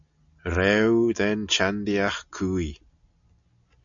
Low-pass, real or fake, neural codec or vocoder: 7.2 kHz; real; none